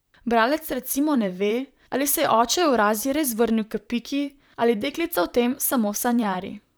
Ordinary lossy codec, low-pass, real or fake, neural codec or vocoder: none; none; fake; vocoder, 44.1 kHz, 128 mel bands, Pupu-Vocoder